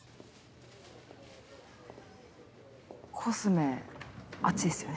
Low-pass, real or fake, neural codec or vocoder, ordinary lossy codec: none; real; none; none